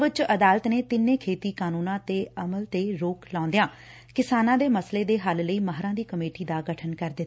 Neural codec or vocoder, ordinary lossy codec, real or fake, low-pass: none; none; real; none